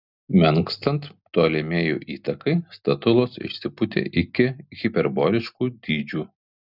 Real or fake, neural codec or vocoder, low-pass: real; none; 5.4 kHz